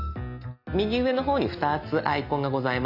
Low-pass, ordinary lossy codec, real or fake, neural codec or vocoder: 5.4 kHz; none; real; none